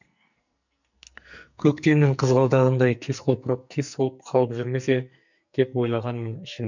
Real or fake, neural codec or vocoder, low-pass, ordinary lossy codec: fake; codec, 44.1 kHz, 2.6 kbps, SNAC; 7.2 kHz; none